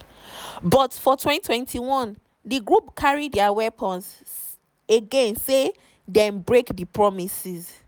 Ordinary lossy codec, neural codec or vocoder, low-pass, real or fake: none; none; none; real